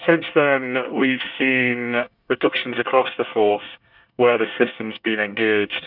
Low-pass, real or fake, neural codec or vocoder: 5.4 kHz; fake; codec, 24 kHz, 1 kbps, SNAC